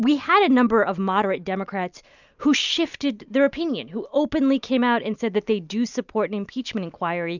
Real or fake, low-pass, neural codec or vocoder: real; 7.2 kHz; none